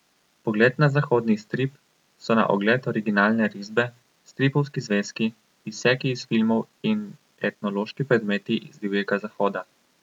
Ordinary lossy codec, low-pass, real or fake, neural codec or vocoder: none; 19.8 kHz; real; none